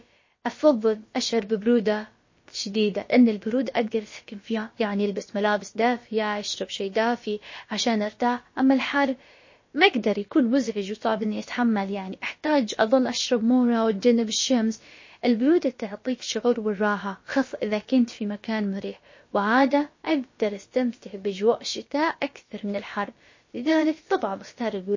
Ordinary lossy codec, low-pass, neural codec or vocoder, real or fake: MP3, 32 kbps; 7.2 kHz; codec, 16 kHz, about 1 kbps, DyCAST, with the encoder's durations; fake